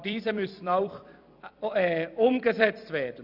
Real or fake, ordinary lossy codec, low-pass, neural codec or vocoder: fake; none; 5.4 kHz; vocoder, 44.1 kHz, 128 mel bands every 256 samples, BigVGAN v2